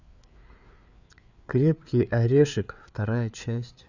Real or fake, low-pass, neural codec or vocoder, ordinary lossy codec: fake; 7.2 kHz; codec, 16 kHz, 4 kbps, FreqCodec, larger model; none